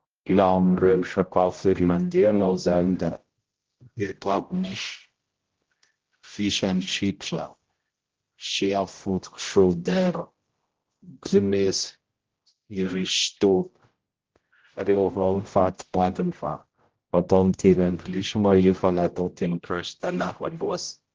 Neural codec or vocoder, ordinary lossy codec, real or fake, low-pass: codec, 16 kHz, 0.5 kbps, X-Codec, HuBERT features, trained on general audio; Opus, 16 kbps; fake; 7.2 kHz